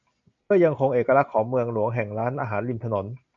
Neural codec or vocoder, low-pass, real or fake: none; 7.2 kHz; real